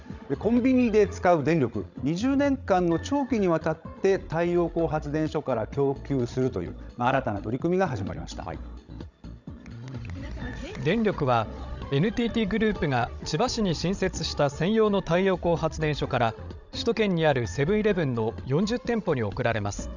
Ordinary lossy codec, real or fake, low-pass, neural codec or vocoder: none; fake; 7.2 kHz; codec, 16 kHz, 16 kbps, FreqCodec, larger model